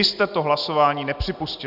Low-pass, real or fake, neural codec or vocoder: 5.4 kHz; real; none